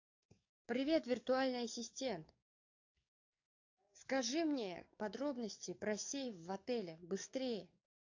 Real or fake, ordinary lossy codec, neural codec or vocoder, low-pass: fake; AAC, 48 kbps; codec, 44.1 kHz, 7.8 kbps, DAC; 7.2 kHz